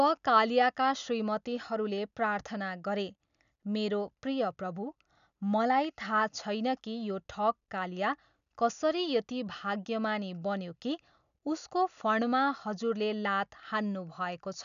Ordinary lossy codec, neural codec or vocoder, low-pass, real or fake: none; none; 7.2 kHz; real